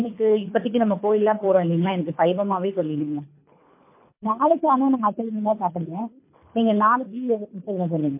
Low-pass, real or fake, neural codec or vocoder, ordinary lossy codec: 3.6 kHz; fake; codec, 24 kHz, 3 kbps, HILCodec; MP3, 24 kbps